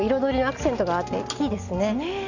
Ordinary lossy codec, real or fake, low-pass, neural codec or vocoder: none; real; 7.2 kHz; none